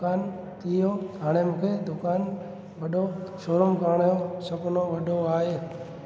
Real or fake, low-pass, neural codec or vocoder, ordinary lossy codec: real; none; none; none